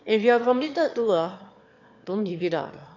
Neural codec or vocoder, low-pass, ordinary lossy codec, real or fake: autoencoder, 22.05 kHz, a latent of 192 numbers a frame, VITS, trained on one speaker; 7.2 kHz; AAC, 48 kbps; fake